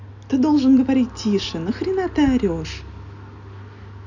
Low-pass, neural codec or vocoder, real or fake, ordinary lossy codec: 7.2 kHz; none; real; none